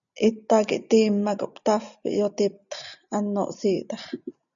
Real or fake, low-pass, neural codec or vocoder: real; 7.2 kHz; none